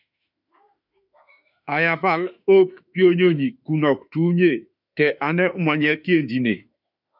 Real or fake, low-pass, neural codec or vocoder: fake; 5.4 kHz; autoencoder, 48 kHz, 32 numbers a frame, DAC-VAE, trained on Japanese speech